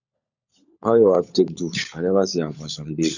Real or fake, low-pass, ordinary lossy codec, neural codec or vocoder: fake; 7.2 kHz; none; codec, 16 kHz, 16 kbps, FunCodec, trained on LibriTTS, 50 frames a second